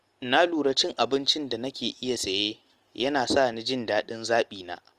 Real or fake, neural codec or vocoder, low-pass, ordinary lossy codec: real; none; 14.4 kHz; Opus, 24 kbps